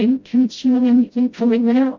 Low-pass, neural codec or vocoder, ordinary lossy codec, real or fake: 7.2 kHz; codec, 16 kHz, 0.5 kbps, FreqCodec, smaller model; MP3, 48 kbps; fake